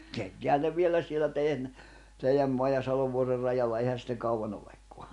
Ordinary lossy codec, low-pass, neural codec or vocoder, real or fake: none; 10.8 kHz; none; real